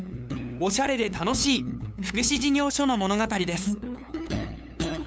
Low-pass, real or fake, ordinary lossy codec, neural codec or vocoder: none; fake; none; codec, 16 kHz, 8 kbps, FunCodec, trained on LibriTTS, 25 frames a second